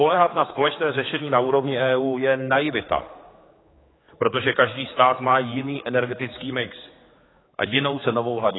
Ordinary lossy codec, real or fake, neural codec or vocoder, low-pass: AAC, 16 kbps; fake; codec, 16 kHz, 4 kbps, X-Codec, HuBERT features, trained on general audio; 7.2 kHz